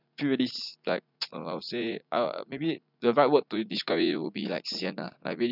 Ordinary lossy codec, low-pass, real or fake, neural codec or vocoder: none; 5.4 kHz; fake; vocoder, 44.1 kHz, 80 mel bands, Vocos